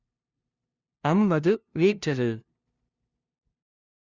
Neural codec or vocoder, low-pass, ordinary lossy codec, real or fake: codec, 16 kHz, 0.5 kbps, FunCodec, trained on LibriTTS, 25 frames a second; 7.2 kHz; Opus, 64 kbps; fake